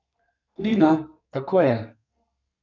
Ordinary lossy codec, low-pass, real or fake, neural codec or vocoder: none; 7.2 kHz; fake; codec, 32 kHz, 1.9 kbps, SNAC